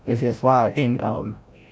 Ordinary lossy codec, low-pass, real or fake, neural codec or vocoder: none; none; fake; codec, 16 kHz, 0.5 kbps, FreqCodec, larger model